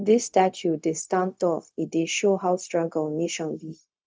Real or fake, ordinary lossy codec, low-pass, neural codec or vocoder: fake; none; none; codec, 16 kHz, 0.4 kbps, LongCat-Audio-Codec